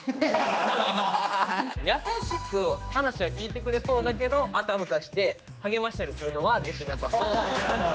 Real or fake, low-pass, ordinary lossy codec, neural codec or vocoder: fake; none; none; codec, 16 kHz, 2 kbps, X-Codec, HuBERT features, trained on general audio